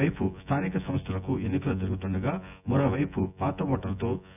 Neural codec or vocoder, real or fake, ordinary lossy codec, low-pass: vocoder, 24 kHz, 100 mel bands, Vocos; fake; none; 3.6 kHz